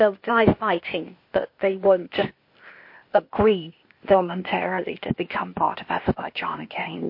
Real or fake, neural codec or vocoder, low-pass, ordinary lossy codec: fake; codec, 16 kHz, 0.8 kbps, ZipCodec; 5.4 kHz; MP3, 32 kbps